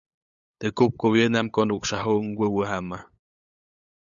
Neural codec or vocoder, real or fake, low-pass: codec, 16 kHz, 8 kbps, FunCodec, trained on LibriTTS, 25 frames a second; fake; 7.2 kHz